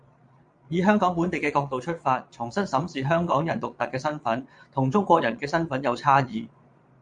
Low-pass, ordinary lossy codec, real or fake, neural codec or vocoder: 9.9 kHz; MP3, 64 kbps; fake; vocoder, 22.05 kHz, 80 mel bands, Vocos